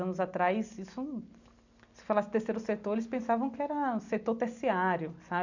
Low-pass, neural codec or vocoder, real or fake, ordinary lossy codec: 7.2 kHz; none; real; none